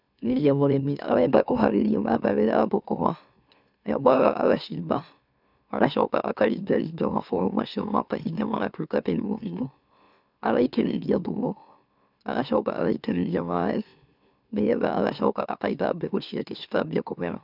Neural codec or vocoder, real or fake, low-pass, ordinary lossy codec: autoencoder, 44.1 kHz, a latent of 192 numbers a frame, MeloTTS; fake; 5.4 kHz; none